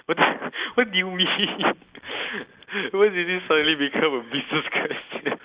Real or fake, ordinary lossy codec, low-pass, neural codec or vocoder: real; Opus, 64 kbps; 3.6 kHz; none